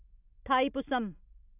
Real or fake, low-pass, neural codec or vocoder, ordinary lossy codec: real; 3.6 kHz; none; AAC, 32 kbps